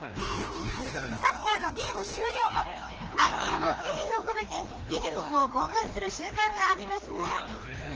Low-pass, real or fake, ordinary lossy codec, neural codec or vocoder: 7.2 kHz; fake; Opus, 16 kbps; codec, 16 kHz, 1 kbps, FreqCodec, larger model